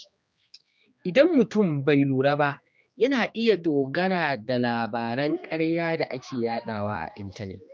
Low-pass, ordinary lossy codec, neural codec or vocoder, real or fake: none; none; codec, 16 kHz, 2 kbps, X-Codec, HuBERT features, trained on general audio; fake